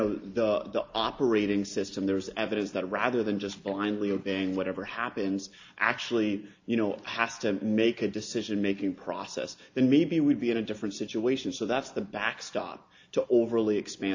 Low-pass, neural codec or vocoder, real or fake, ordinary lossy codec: 7.2 kHz; none; real; AAC, 48 kbps